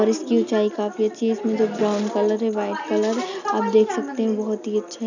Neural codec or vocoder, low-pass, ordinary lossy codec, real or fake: none; 7.2 kHz; none; real